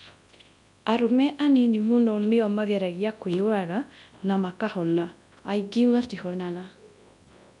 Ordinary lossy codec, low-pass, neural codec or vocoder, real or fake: MP3, 64 kbps; 10.8 kHz; codec, 24 kHz, 0.9 kbps, WavTokenizer, large speech release; fake